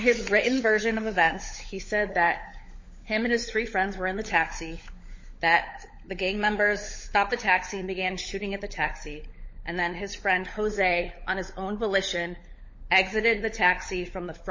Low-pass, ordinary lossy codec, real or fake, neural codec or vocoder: 7.2 kHz; MP3, 32 kbps; fake; codec, 16 kHz, 16 kbps, FunCodec, trained on LibriTTS, 50 frames a second